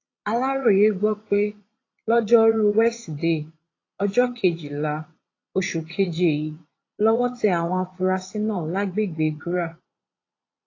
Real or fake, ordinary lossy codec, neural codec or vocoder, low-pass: fake; AAC, 32 kbps; vocoder, 22.05 kHz, 80 mel bands, Vocos; 7.2 kHz